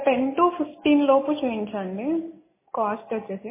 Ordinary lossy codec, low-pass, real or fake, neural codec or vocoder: MP3, 16 kbps; 3.6 kHz; real; none